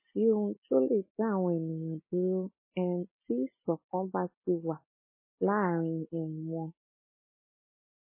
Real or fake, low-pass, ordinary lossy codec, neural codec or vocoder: real; 3.6 kHz; MP3, 16 kbps; none